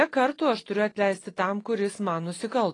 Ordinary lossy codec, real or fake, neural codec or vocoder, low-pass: AAC, 32 kbps; real; none; 10.8 kHz